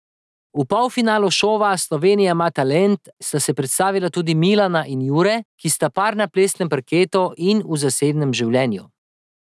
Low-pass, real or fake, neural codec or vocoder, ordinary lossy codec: none; real; none; none